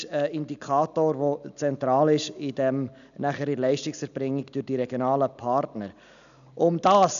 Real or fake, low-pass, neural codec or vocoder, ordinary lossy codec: real; 7.2 kHz; none; none